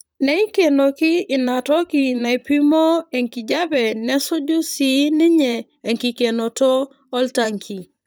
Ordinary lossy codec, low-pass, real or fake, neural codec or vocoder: none; none; fake; vocoder, 44.1 kHz, 128 mel bands, Pupu-Vocoder